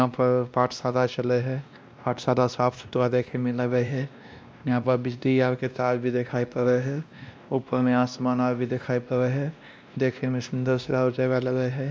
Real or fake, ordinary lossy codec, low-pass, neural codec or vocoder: fake; none; none; codec, 16 kHz, 1 kbps, X-Codec, WavLM features, trained on Multilingual LibriSpeech